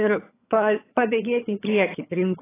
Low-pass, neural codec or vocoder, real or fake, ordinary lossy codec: 3.6 kHz; vocoder, 22.05 kHz, 80 mel bands, HiFi-GAN; fake; AAC, 16 kbps